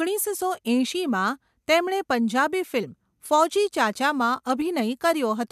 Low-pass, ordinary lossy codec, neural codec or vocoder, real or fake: 14.4 kHz; MP3, 96 kbps; none; real